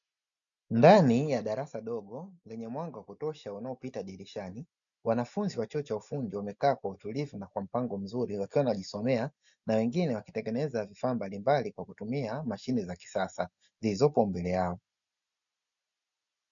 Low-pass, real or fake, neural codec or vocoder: 7.2 kHz; real; none